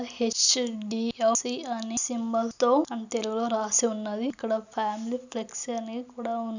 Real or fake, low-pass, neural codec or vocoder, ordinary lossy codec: real; 7.2 kHz; none; none